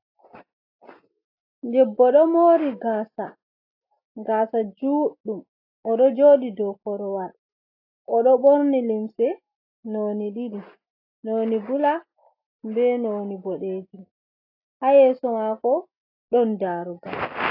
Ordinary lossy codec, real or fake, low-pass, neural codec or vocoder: AAC, 32 kbps; real; 5.4 kHz; none